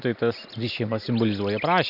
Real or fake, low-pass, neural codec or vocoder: real; 5.4 kHz; none